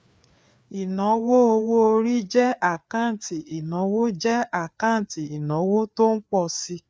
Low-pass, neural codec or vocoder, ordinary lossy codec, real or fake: none; codec, 16 kHz, 4 kbps, FreqCodec, larger model; none; fake